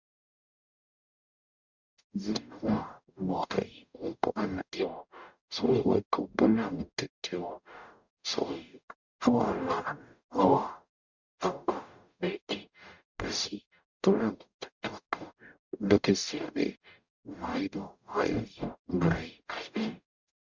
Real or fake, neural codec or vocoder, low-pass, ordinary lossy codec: fake; codec, 44.1 kHz, 0.9 kbps, DAC; 7.2 kHz; Opus, 64 kbps